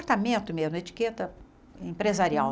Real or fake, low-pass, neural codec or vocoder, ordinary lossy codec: real; none; none; none